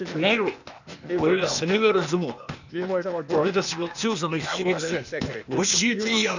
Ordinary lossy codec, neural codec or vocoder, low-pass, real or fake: none; codec, 16 kHz, 0.8 kbps, ZipCodec; 7.2 kHz; fake